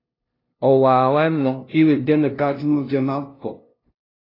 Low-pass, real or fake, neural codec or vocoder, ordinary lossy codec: 5.4 kHz; fake; codec, 16 kHz, 0.5 kbps, FunCodec, trained on LibriTTS, 25 frames a second; AAC, 24 kbps